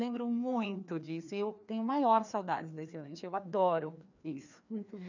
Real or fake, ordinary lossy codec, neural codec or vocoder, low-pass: fake; none; codec, 16 kHz, 2 kbps, FreqCodec, larger model; 7.2 kHz